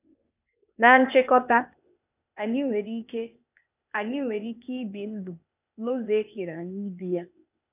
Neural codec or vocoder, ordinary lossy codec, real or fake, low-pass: codec, 16 kHz, 0.8 kbps, ZipCodec; none; fake; 3.6 kHz